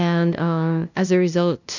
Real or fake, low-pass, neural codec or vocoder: fake; 7.2 kHz; codec, 16 kHz, 0.5 kbps, FunCodec, trained on LibriTTS, 25 frames a second